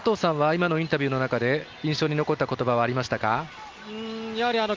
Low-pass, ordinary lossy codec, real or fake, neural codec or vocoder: 7.2 kHz; Opus, 32 kbps; real; none